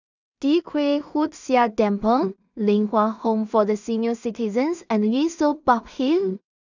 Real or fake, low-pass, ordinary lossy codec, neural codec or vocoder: fake; 7.2 kHz; none; codec, 16 kHz in and 24 kHz out, 0.4 kbps, LongCat-Audio-Codec, two codebook decoder